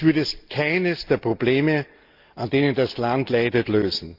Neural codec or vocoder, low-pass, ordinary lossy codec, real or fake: none; 5.4 kHz; Opus, 32 kbps; real